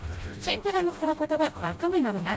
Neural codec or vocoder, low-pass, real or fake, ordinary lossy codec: codec, 16 kHz, 0.5 kbps, FreqCodec, smaller model; none; fake; none